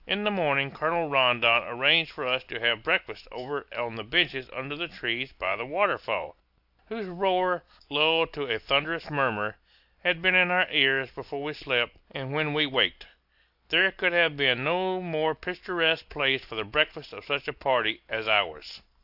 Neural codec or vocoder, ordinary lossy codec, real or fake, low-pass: none; AAC, 48 kbps; real; 5.4 kHz